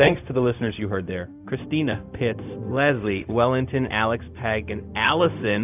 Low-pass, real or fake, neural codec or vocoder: 3.6 kHz; fake; codec, 16 kHz, 0.4 kbps, LongCat-Audio-Codec